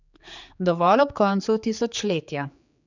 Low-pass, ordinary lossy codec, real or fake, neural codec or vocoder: 7.2 kHz; none; fake; codec, 16 kHz, 4 kbps, X-Codec, HuBERT features, trained on general audio